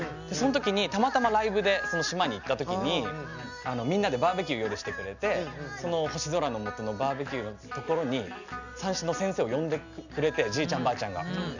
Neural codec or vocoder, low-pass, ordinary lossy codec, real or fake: none; 7.2 kHz; none; real